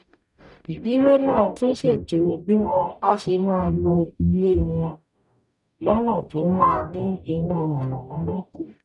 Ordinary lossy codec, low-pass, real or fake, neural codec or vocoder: none; 10.8 kHz; fake; codec, 44.1 kHz, 0.9 kbps, DAC